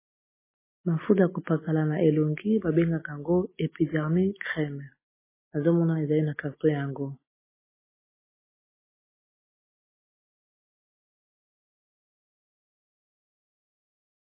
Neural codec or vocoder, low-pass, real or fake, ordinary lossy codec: none; 3.6 kHz; real; MP3, 16 kbps